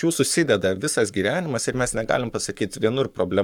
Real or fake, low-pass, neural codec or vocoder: fake; 19.8 kHz; codec, 44.1 kHz, 7.8 kbps, Pupu-Codec